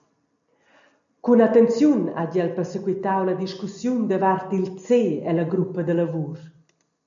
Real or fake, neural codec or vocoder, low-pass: real; none; 7.2 kHz